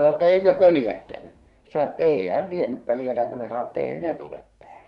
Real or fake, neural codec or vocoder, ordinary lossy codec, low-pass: fake; codec, 24 kHz, 1 kbps, SNAC; none; 10.8 kHz